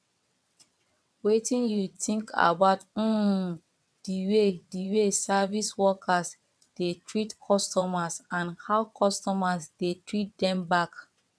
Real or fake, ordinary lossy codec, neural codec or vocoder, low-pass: fake; none; vocoder, 22.05 kHz, 80 mel bands, WaveNeXt; none